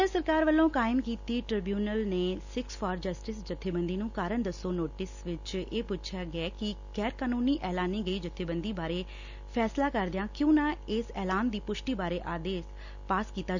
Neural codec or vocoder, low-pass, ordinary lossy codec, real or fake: none; 7.2 kHz; none; real